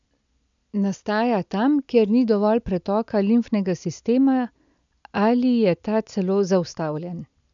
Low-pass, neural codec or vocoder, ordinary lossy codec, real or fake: 7.2 kHz; none; none; real